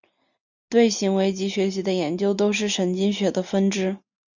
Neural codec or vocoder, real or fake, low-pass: none; real; 7.2 kHz